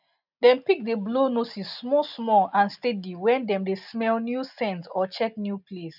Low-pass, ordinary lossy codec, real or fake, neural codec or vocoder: 5.4 kHz; none; real; none